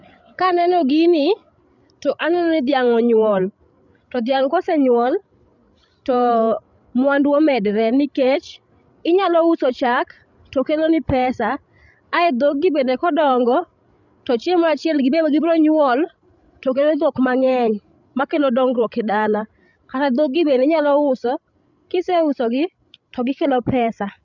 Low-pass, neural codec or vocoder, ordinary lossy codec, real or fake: 7.2 kHz; codec, 16 kHz, 16 kbps, FreqCodec, larger model; none; fake